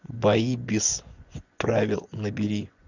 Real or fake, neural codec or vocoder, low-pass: fake; vocoder, 24 kHz, 100 mel bands, Vocos; 7.2 kHz